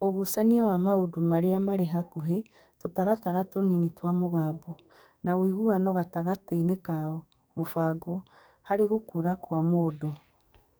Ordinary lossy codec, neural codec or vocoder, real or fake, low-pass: none; codec, 44.1 kHz, 2.6 kbps, SNAC; fake; none